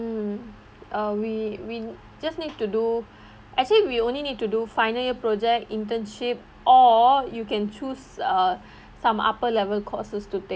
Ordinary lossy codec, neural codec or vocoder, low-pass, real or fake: none; none; none; real